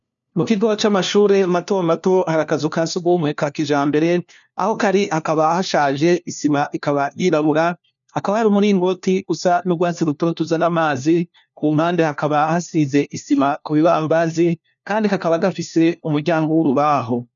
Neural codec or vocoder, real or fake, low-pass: codec, 16 kHz, 1 kbps, FunCodec, trained on LibriTTS, 50 frames a second; fake; 7.2 kHz